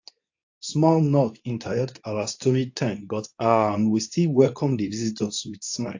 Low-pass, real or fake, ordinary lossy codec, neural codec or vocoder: 7.2 kHz; fake; AAC, 48 kbps; codec, 24 kHz, 0.9 kbps, WavTokenizer, medium speech release version 2